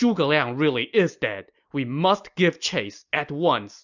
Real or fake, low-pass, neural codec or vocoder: real; 7.2 kHz; none